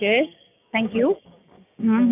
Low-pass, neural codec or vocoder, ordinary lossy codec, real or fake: 3.6 kHz; codec, 16 kHz, 6 kbps, DAC; none; fake